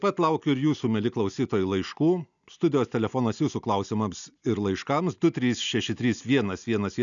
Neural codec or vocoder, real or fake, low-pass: none; real; 7.2 kHz